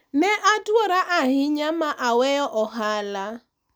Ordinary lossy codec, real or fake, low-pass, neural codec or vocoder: none; real; none; none